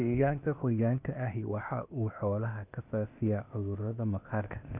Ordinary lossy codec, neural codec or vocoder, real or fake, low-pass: none; codec, 16 kHz, 0.8 kbps, ZipCodec; fake; 3.6 kHz